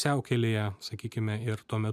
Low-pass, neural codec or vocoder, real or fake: 14.4 kHz; none; real